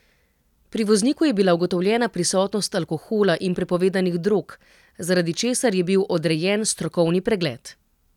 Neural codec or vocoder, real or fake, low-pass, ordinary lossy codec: none; real; 19.8 kHz; none